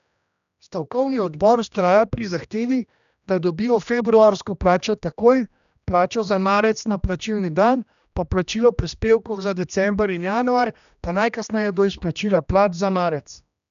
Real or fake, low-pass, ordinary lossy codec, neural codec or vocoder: fake; 7.2 kHz; none; codec, 16 kHz, 1 kbps, X-Codec, HuBERT features, trained on general audio